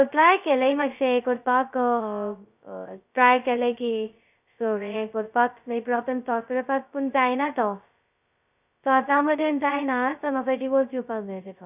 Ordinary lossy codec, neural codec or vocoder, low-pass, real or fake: none; codec, 16 kHz, 0.2 kbps, FocalCodec; 3.6 kHz; fake